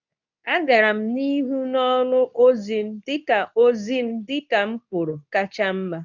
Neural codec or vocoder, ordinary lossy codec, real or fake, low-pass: codec, 24 kHz, 0.9 kbps, WavTokenizer, medium speech release version 2; none; fake; 7.2 kHz